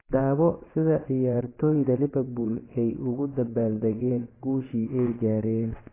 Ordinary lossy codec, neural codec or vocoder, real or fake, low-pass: AAC, 16 kbps; vocoder, 22.05 kHz, 80 mel bands, WaveNeXt; fake; 3.6 kHz